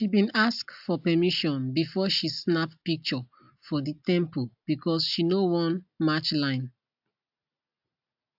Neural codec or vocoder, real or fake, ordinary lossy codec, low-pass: none; real; AAC, 48 kbps; 5.4 kHz